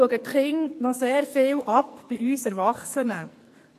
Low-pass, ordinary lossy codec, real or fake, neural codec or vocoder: 14.4 kHz; AAC, 64 kbps; fake; codec, 44.1 kHz, 2.6 kbps, SNAC